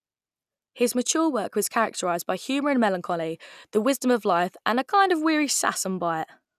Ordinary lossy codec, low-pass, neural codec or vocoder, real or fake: none; 14.4 kHz; none; real